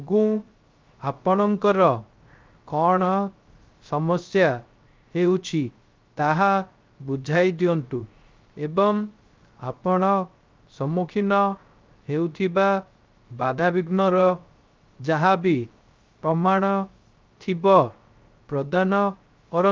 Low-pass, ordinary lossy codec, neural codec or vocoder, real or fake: 7.2 kHz; Opus, 24 kbps; codec, 16 kHz, 0.3 kbps, FocalCodec; fake